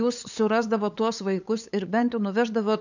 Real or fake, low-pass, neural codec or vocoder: fake; 7.2 kHz; codec, 16 kHz, 8 kbps, FunCodec, trained on LibriTTS, 25 frames a second